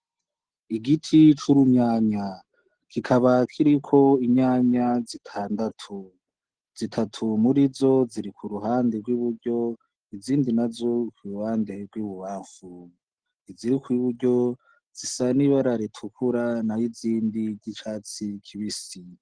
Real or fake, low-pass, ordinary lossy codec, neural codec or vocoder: real; 9.9 kHz; Opus, 16 kbps; none